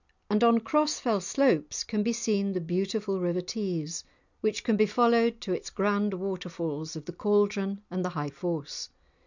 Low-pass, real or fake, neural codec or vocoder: 7.2 kHz; real; none